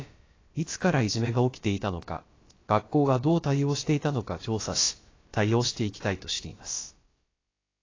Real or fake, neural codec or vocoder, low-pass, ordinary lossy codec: fake; codec, 16 kHz, about 1 kbps, DyCAST, with the encoder's durations; 7.2 kHz; AAC, 32 kbps